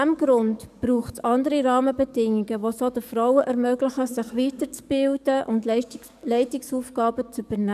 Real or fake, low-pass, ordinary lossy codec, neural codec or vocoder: fake; 14.4 kHz; none; codec, 44.1 kHz, 7.8 kbps, DAC